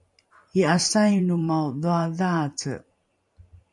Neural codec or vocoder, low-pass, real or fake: vocoder, 44.1 kHz, 128 mel bands every 512 samples, BigVGAN v2; 10.8 kHz; fake